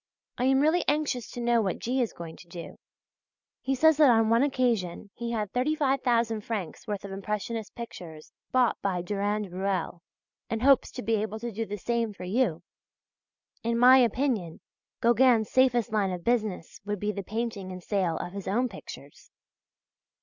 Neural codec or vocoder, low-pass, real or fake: none; 7.2 kHz; real